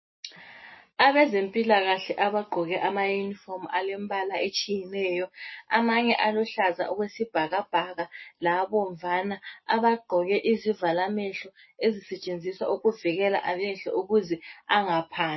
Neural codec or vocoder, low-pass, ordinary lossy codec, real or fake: none; 7.2 kHz; MP3, 24 kbps; real